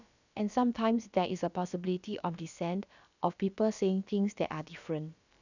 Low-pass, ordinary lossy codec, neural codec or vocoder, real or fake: 7.2 kHz; none; codec, 16 kHz, about 1 kbps, DyCAST, with the encoder's durations; fake